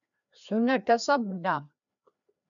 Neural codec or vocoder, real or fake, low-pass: codec, 16 kHz, 2 kbps, FreqCodec, larger model; fake; 7.2 kHz